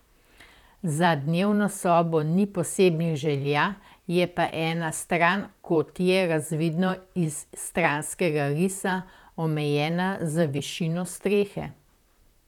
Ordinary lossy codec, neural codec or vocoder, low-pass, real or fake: none; vocoder, 44.1 kHz, 128 mel bands, Pupu-Vocoder; 19.8 kHz; fake